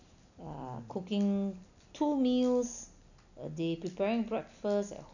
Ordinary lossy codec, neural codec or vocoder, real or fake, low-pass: none; none; real; 7.2 kHz